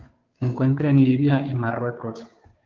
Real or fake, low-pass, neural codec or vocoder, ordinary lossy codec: fake; 7.2 kHz; codec, 16 kHz, 2 kbps, FunCodec, trained on Chinese and English, 25 frames a second; Opus, 32 kbps